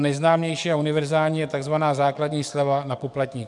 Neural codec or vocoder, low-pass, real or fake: codec, 44.1 kHz, 7.8 kbps, DAC; 10.8 kHz; fake